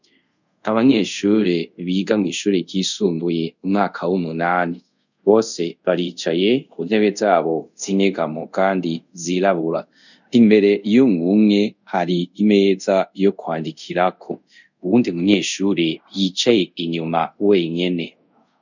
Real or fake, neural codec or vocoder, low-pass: fake; codec, 24 kHz, 0.5 kbps, DualCodec; 7.2 kHz